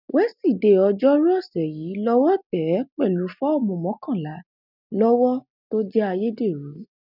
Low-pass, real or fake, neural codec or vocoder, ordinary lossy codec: 5.4 kHz; real; none; none